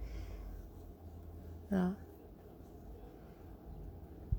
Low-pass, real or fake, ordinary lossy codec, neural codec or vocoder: none; real; none; none